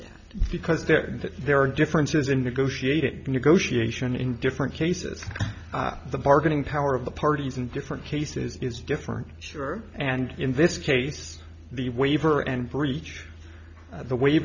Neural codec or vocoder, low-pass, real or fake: none; 7.2 kHz; real